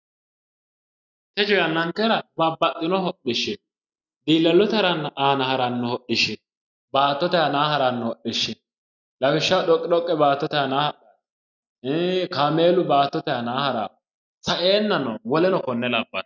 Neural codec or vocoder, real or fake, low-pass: none; real; 7.2 kHz